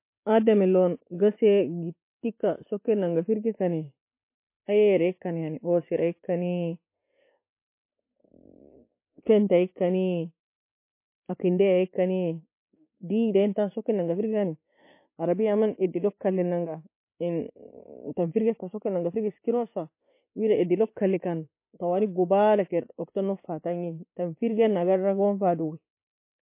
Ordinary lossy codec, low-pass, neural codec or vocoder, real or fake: MP3, 24 kbps; 3.6 kHz; none; real